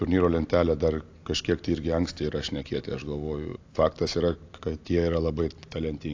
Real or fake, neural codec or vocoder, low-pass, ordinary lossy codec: real; none; 7.2 kHz; AAC, 48 kbps